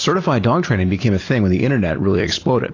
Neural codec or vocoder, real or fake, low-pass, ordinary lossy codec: none; real; 7.2 kHz; AAC, 32 kbps